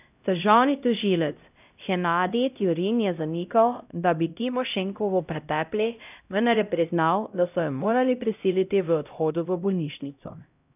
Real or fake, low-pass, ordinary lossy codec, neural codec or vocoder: fake; 3.6 kHz; none; codec, 16 kHz, 0.5 kbps, X-Codec, HuBERT features, trained on LibriSpeech